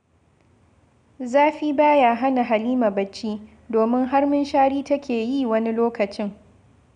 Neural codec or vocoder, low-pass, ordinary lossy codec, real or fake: none; 9.9 kHz; none; real